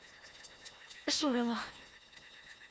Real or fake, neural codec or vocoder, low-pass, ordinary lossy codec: fake; codec, 16 kHz, 1 kbps, FunCodec, trained on Chinese and English, 50 frames a second; none; none